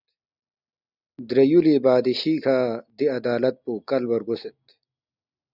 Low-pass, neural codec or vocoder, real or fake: 5.4 kHz; none; real